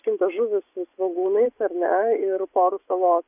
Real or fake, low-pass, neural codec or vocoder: fake; 3.6 kHz; vocoder, 24 kHz, 100 mel bands, Vocos